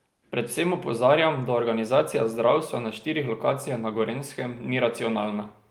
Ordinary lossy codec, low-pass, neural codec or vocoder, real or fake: Opus, 32 kbps; 19.8 kHz; none; real